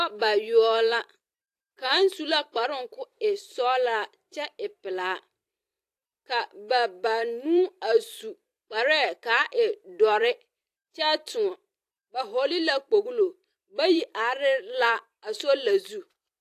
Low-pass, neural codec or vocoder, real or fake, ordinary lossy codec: 14.4 kHz; vocoder, 44.1 kHz, 128 mel bands every 256 samples, BigVGAN v2; fake; AAC, 64 kbps